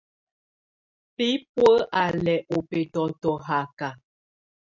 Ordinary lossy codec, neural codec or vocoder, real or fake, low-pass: MP3, 64 kbps; none; real; 7.2 kHz